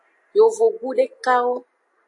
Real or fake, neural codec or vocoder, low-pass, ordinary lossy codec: real; none; 10.8 kHz; AAC, 48 kbps